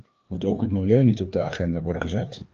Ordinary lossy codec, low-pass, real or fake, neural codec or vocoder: Opus, 24 kbps; 7.2 kHz; fake; codec, 16 kHz, 2 kbps, FreqCodec, larger model